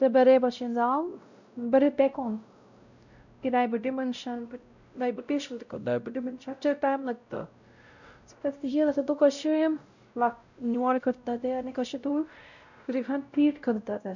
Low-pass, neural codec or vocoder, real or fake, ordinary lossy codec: 7.2 kHz; codec, 16 kHz, 0.5 kbps, X-Codec, WavLM features, trained on Multilingual LibriSpeech; fake; none